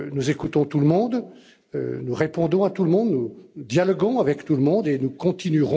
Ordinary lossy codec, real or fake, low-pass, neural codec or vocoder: none; real; none; none